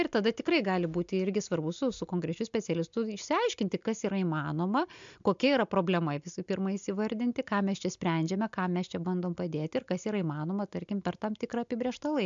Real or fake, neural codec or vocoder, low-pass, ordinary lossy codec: real; none; 7.2 kHz; MP3, 64 kbps